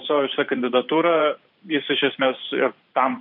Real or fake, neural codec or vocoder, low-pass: fake; vocoder, 44.1 kHz, 128 mel bands every 512 samples, BigVGAN v2; 5.4 kHz